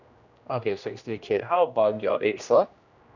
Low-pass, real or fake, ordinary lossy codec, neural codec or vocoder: 7.2 kHz; fake; none; codec, 16 kHz, 1 kbps, X-Codec, HuBERT features, trained on general audio